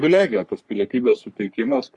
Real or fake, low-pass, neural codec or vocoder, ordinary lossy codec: fake; 10.8 kHz; codec, 44.1 kHz, 3.4 kbps, Pupu-Codec; Opus, 64 kbps